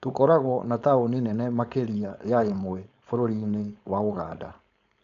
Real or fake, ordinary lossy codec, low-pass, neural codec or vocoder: fake; none; 7.2 kHz; codec, 16 kHz, 4.8 kbps, FACodec